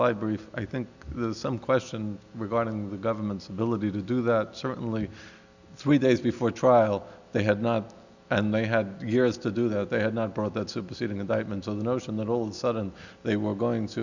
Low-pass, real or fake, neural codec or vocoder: 7.2 kHz; real; none